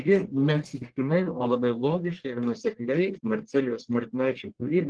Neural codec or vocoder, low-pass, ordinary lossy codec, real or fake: codec, 44.1 kHz, 1.7 kbps, Pupu-Codec; 9.9 kHz; Opus, 16 kbps; fake